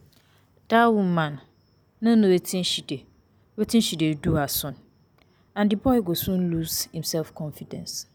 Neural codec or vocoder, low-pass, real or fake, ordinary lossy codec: none; none; real; none